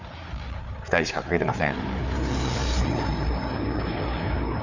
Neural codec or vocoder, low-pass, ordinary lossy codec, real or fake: codec, 16 kHz, 4 kbps, FreqCodec, larger model; 7.2 kHz; Opus, 64 kbps; fake